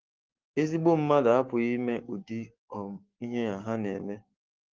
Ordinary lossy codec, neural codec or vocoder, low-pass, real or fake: Opus, 16 kbps; codec, 44.1 kHz, 7.8 kbps, Pupu-Codec; 7.2 kHz; fake